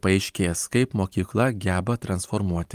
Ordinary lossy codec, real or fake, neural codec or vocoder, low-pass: Opus, 32 kbps; fake; vocoder, 44.1 kHz, 128 mel bands every 512 samples, BigVGAN v2; 14.4 kHz